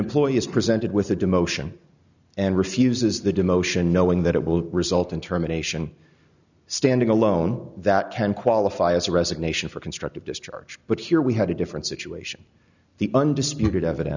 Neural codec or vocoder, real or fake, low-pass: none; real; 7.2 kHz